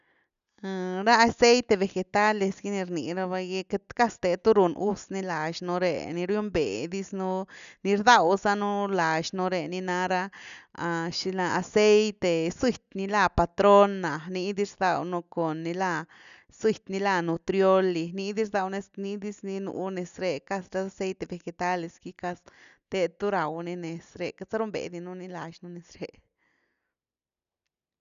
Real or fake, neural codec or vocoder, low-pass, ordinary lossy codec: real; none; 7.2 kHz; none